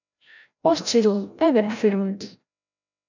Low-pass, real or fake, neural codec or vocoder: 7.2 kHz; fake; codec, 16 kHz, 0.5 kbps, FreqCodec, larger model